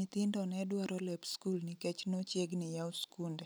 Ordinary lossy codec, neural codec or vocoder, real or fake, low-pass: none; none; real; none